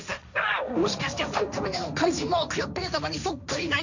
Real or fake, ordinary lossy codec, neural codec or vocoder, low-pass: fake; none; codec, 16 kHz, 1.1 kbps, Voila-Tokenizer; 7.2 kHz